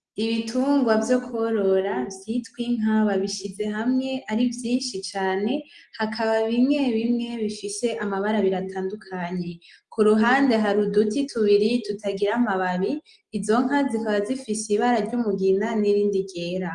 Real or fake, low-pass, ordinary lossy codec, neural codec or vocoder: real; 10.8 kHz; Opus, 24 kbps; none